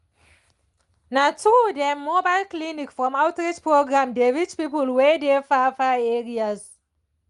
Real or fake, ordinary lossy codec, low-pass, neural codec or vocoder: real; Opus, 32 kbps; 10.8 kHz; none